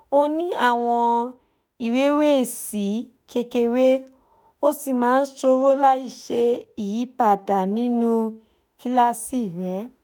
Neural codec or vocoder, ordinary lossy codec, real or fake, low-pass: autoencoder, 48 kHz, 32 numbers a frame, DAC-VAE, trained on Japanese speech; none; fake; none